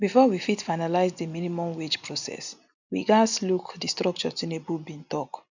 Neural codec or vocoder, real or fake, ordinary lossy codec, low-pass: none; real; none; 7.2 kHz